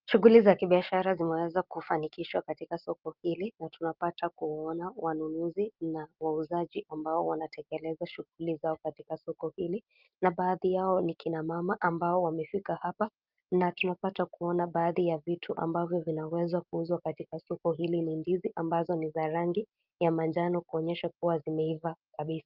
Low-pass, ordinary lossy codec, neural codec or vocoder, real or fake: 5.4 kHz; Opus, 32 kbps; none; real